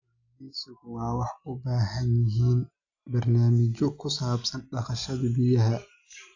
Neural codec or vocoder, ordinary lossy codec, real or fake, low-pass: none; AAC, 48 kbps; real; 7.2 kHz